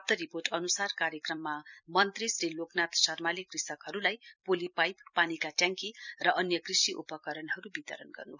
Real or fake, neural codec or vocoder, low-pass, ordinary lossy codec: fake; vocoder, 44.1 kHz, 128 mel bands every 256 samples, BigVGAN v2; 7.2 kHz; none